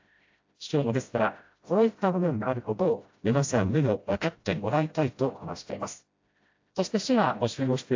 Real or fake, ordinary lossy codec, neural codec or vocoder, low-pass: fake; AAC, 48 kbps; codec, 16 kHz, 0.5 kbps, FreqCodec, smaller model; 7.2 kHz